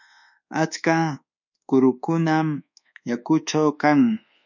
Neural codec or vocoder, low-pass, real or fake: codec, 24 kHz, 1.2 kbps, DualCodec; 7.2 kHz; fake